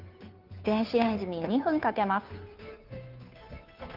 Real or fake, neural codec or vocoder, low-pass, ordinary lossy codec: fake; codec, 16 kHz, 2 kbps, FunCodec, trained on Chinese and English, 25 frames a second; 5.4 kHz; Opus, 32 kbps